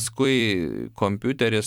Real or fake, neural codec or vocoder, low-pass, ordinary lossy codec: fake; vocoder, 44.1 kHz, 128 mel bands every 256 samples, BigVGAN v2; 19.8 kHz; MP3, 96 kbps